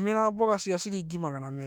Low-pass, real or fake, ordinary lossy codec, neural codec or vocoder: 19.8 kHz; fake; none; autoencoder, 48 kHz, 32 numbers a frame, DAC-VAE, trained on Japanese speech